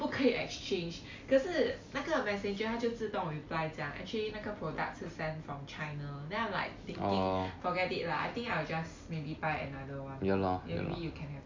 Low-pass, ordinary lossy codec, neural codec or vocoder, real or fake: 7.2 kHz; MP3, 48 kbps; none; real